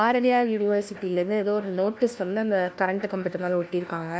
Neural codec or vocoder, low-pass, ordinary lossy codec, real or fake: codec, 16 kHz, 1 kbps, FunCodec, trained on LibriTTS, 50 frames a second; none; none; fake